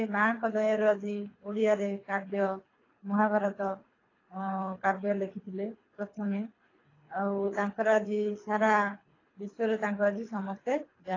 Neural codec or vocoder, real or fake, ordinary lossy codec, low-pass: codec, 24 kHz, 3 kbps, HILCodec; fake; AAC, 32 kbps; 7.2 kHz